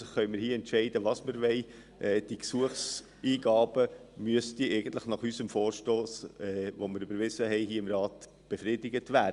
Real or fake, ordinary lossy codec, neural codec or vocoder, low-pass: real; Opus, 64 kbps; none; 10.8 kHz